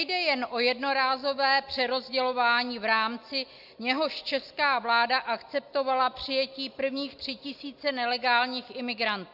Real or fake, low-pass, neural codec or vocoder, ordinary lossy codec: real; 5.4 kHz; none; MP3, 48 kbps